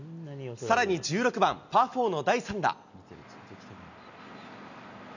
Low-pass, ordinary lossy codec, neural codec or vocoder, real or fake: 7.2 kHz; none; none; real